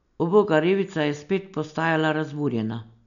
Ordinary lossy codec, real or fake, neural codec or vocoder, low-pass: none; real; none; 7.2 kHz